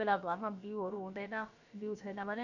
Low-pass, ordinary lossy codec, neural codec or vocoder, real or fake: 7.2 kHz; none; codec, 16 kHz, about 1 kbps, DyCAST, with the encoder's durations; fake